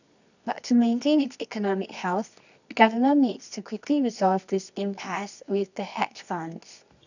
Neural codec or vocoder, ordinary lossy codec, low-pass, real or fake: codec, 24 kHz, 0.9 kbps, WavTokenizer, medium music audio release; none; 7.2 kHz; fake